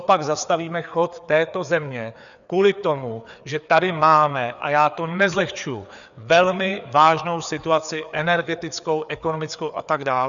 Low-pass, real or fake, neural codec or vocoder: 7.2 kHz; fake; codec, 16 kHz, 4 kbps, FreqCodec, larger model